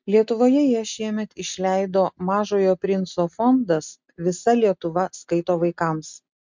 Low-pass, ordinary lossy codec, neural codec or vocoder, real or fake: 7.2 kHz; MP3, 64 kbps; none; real